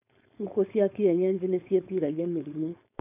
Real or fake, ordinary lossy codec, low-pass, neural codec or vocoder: fake; none; 3.6 kHz; codec, 16 kHz, 4.8 kbps, FACodec